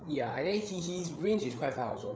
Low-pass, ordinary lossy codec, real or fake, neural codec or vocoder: none; none; fake; codec, 16 kHz, 8 kbps, FreqCodec, larger model